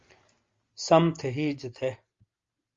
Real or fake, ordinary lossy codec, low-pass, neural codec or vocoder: real; Opus, 32 kbps; 7.2 kHz; none